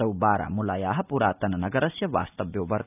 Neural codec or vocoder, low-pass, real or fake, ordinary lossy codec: none; 3.6 kHz; real; none